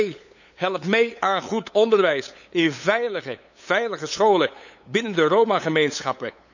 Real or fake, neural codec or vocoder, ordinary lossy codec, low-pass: fake; codec, 16 kHz, 8 kbps, FunCodec, trained on LibriTTS, 25 frames a second; none; 7.2 kHz